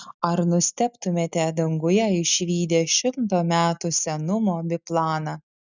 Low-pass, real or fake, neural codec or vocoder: 7.2 kHz; real; none